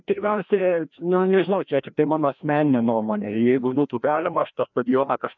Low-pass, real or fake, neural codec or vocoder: 7.2 kHz; fake; codec, 16 kHz, 1 kbps, FreqCodec, larger model